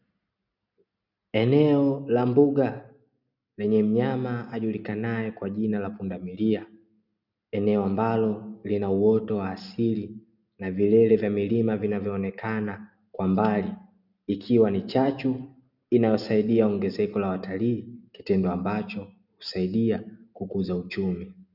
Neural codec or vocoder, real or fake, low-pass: none; real; 5.4 kHz